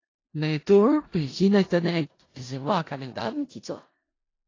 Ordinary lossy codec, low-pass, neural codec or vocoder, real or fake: AAC, 32 kbps; 7.2 kHz; codec, 16 kHz in and 24 kHz out, 0.4 kbps, LongCat-Audio-Codec, four codebook decoder; fake